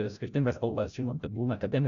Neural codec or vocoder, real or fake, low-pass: codec, 16 kHz, 0.5 kbps, FreqCodec, larger model; fake; 7.2 kHz